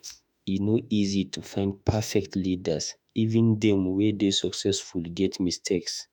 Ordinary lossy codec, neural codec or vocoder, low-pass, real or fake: none; autoencoder, 48 kHz, 32 numbers a frame, DAC-VAE, trained on Japanese speech; 19.8 kHz; fake